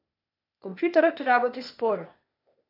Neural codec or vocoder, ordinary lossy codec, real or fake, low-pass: codec, 16 kHz, 0.8 kbps, ZipCodec; AAC, 32 kbps; fake; 5.4 kHz